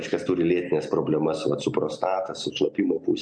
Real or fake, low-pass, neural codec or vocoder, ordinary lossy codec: real; 9.9 kHz; none; MP3, 64 kbps